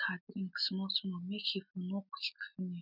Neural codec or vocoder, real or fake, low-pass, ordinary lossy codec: none; real; 5.4 kHz; none